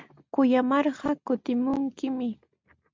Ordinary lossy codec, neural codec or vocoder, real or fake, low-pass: MP3, 64 kbps; none; real; 7.2 kHz